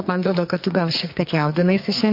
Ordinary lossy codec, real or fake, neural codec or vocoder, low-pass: MP3, 32 kbps; fake; codec, 44.1 kHz, 2.6 kbps, SNAC; 5.4 kHz